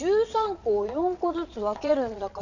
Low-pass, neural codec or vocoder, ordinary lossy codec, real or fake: 7.2 kHz; vocoder, 22.05 kHz, 80 mel bands, Vocos; none; fake